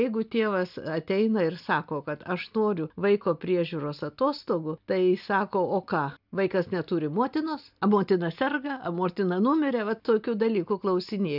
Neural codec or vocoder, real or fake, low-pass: none; real; 5.4 kHz